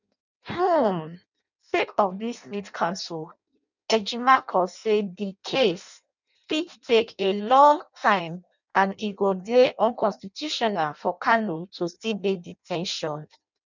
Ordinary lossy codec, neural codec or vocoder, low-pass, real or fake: none; codec, 16 kHz in and 24 kHz out, 0.6 kbps, FireRedTTS-2 codec; 7.2 kHz; fake